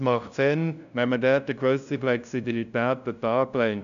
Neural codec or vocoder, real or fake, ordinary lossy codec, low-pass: codec, 16 kHz, 0.5 kbps, FunCodec, trained on LibriTTS, 25 frames a second; fake; none; 7.2 kHz